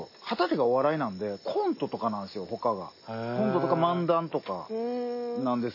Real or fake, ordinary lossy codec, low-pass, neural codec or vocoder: real; MP3, 24 kbps; 5.4 kHz; none